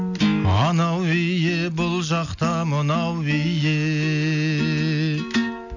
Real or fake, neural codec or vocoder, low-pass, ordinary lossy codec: real; none; 7.2 kHz; none